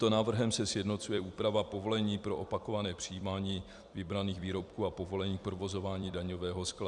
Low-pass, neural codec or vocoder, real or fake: 10.8 kHz; vocoder, 44.1 kHz, 128 mel bands every 256 samples, BigVGAN v2; fake